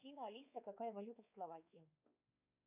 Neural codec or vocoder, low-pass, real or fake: codec, 16 kHz, 4 kbps, X-Codec, WavLM features, trained on Multilingual LibriSpeech; 3.6 kHz; fake